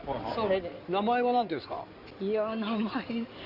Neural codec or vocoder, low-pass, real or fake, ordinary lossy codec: codec, 16 kHz in and 24 kHz out, 2.2 kbps, FireRedTTS-2 codec; 5.4 kHz; fake; Opus, 64 kbps